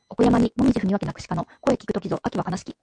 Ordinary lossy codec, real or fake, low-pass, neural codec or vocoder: MP3, 64 kbps; fake; 9.9 kHz; autoencoder, 48 kHz, 128 numbers a frame, DAC-VAE, trained on Japanese speech